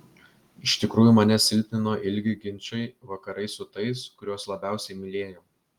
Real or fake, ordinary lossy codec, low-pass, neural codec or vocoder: real; Opus, 24 kbps; 19.8 kHz; none